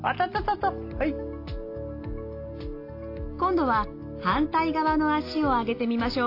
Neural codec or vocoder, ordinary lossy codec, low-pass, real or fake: none; AAC, 32 kbps; 5.4 kHz; real